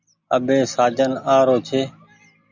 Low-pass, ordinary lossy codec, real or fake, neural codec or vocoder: 7.2 kHz; Opus, 64 kbps; real; none